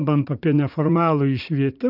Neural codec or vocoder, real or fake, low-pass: vocoder, 44.1 kHz, 80 mel bands, Vocos; fake; 5.4 kHz